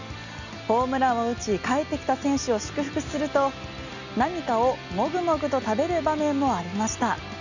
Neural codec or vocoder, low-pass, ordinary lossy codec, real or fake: none; 7.2 kHz; none; real